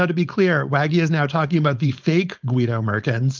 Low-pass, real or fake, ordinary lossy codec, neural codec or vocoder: 7.2 kHz; fake; Opus, 24 kbps; codec, 16 kHz, 4.8 kbps, FACodec